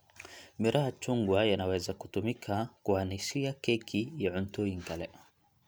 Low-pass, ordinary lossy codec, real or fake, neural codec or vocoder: none; none; real; none